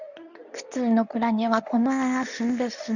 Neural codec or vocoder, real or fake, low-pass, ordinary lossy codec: codec, 24 kHz, 0.9 kbps, WavTokenizer, medium speech release version 2; fake; 7.2 kHz; none